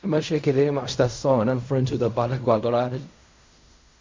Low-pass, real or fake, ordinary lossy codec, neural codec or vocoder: 7.2 kHz; fake; MP3, 48 kbps; codec, 16 kHz in and 24 kHz out, 0.4 kbps, LongCat-Audio-Codec, fine tuned four codebook decoder